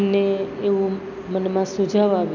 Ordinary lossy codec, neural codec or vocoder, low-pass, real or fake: none; none; 7.2 kHz; real